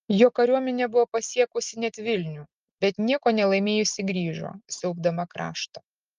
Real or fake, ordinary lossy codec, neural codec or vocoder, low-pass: real; Opus, 32 kbps; none; 7.2 kHz